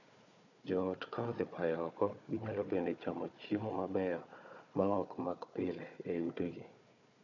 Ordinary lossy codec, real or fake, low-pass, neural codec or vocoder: none; fake; 7.2 kHz; codec, 16 kHz, 4 kbps, FunCodec, trained on Chinese and English, 50 frames a second